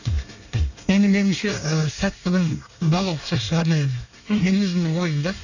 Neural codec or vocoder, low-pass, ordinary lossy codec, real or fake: codec, 24 kHz, 1 kbps, SNAC; 7.2 kHz; none; fake